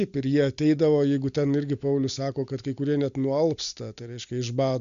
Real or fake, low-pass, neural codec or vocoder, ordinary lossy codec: real; 7.2 kHz; none; Opus, 64 kbps